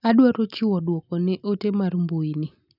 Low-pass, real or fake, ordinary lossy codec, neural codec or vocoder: 5.4 kHz; real; none; none